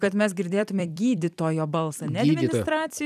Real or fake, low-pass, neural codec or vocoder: fake; 14.4 kHz; vocoder, 44.1 kHz, 128 mel bands every 256 samples, BigVGAN v2